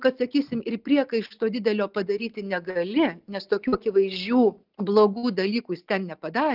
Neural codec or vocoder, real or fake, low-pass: none; real; 5.4 kHz